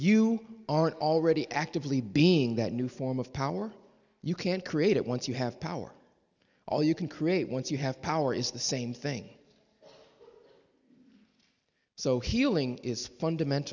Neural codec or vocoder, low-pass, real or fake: none; 7.2 kHz; real